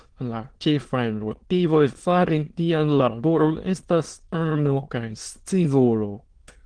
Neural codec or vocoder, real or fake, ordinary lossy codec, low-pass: autoencoder, 22.05 kHz, a latent of 192 numbers a frame, VITS, trained on many speakers; fake; Opus, 16 kbps; 9.9 kHz